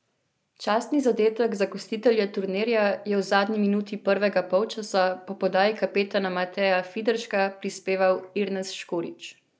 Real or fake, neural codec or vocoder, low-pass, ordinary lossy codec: real; none; none; none